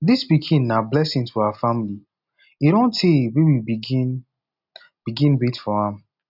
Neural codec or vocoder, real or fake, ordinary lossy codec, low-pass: none; real; none; 5.4 kHz